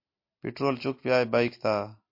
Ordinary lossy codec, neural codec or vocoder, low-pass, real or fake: MP3, 32 kbps; none; 5.4 kHz; real